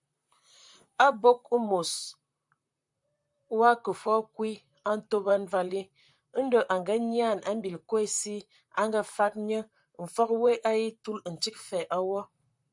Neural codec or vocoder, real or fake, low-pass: vocoder, 44.1 kHz, 128 mel bands, Pupu-Vocoder; fake; 10.8 kHz